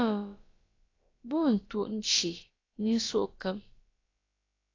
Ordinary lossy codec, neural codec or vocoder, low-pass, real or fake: AAC, 32 kbps; codec, 16 kHz, about 1 kbps, DyCAST, with the encoder's durations; 7.2 kHz; fake